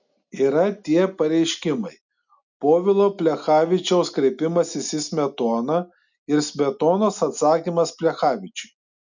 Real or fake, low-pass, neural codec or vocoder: real; 7.2 kHz; none